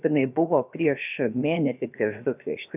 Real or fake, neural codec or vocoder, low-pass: fake; codec, 16 kHz, about 1 kbps, DyCAST, with the encoder's durations; 3.6 kHz